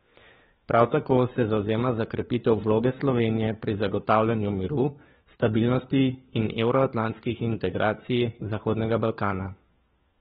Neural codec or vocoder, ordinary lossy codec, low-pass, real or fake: codec, 16 kHz, 4 kbps, FunCodec, trained on LibriTTS, 50 frames a second; AAC, 16 kbps; 7.2 kHz; fake